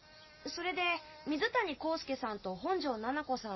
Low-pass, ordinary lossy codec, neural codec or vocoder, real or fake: 7.2 kHz; MP3, 24 kbps; none; real